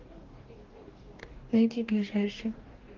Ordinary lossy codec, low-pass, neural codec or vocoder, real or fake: Opus, 16 kbps; 7.2 kHz; codec, 44.1 kHz, 2.6 kbps, DAC; fake